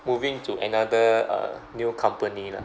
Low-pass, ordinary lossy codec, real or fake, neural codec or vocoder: none; none; real; none